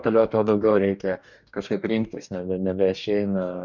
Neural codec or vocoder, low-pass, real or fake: codec, 44.1 kHz, 2.6 kbps, DAC; 7.2 kHz; fake